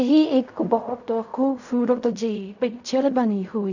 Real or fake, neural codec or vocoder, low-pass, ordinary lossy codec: fake; codec, 16 kHz in and 24 kHz out, 0.4 kbps, LongCat-Audio-Codec, fine tuned four codebook decoder; 7.2 kHz; none